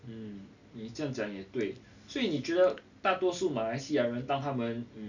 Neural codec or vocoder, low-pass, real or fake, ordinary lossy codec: none; 7.2 kHz; real; none